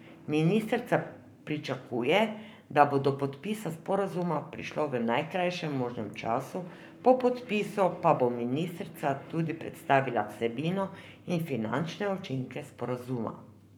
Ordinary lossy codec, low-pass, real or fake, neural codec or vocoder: none; none; fake; codec, 44.1 kHz, 7.8 kbps, Pupu-Codec